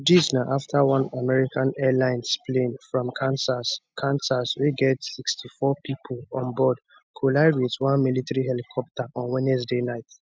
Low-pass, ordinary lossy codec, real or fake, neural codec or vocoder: none; none; real; none